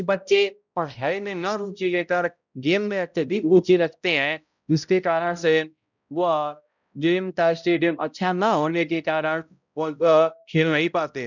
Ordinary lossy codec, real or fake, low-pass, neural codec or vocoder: none; fake; 7.2 kHz; codec, 16 kHz, 0.5 kbps, X-Codec, HuBERT features, trained on balanced general audio